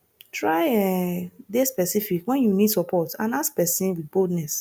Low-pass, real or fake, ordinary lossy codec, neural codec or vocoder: none; real; none; none